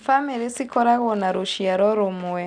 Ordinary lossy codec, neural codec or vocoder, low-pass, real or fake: Opus, 64 kbps; none; 9.9 kHz; real